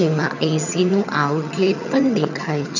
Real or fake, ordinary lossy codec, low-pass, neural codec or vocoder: fake; none; 7.2 kHz; vocoder, 22.05 kHz, 80 mel bands, HiFi-GAN